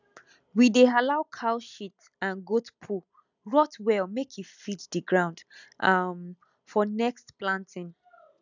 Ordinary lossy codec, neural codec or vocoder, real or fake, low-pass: none; none; real; 7.2 kHz